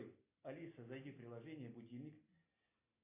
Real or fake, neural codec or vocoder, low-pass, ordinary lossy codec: real; none; 3.6 kHz; AAC, 24 kbps